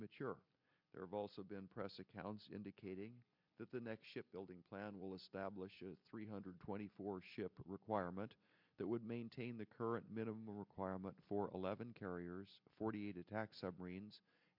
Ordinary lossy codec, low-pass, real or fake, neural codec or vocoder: MP3, 48 kbps; 5.4 kHz; real; none